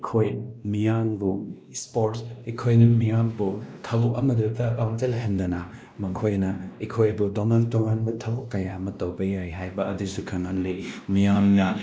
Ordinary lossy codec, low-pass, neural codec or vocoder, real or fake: none; none; codec, 16 kHz, 1 kbps, X-Codec, WavLM features, trained on Multilingual LibriSpeech; fake